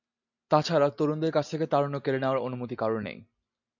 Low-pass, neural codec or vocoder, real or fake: 7.2 kHz; none; real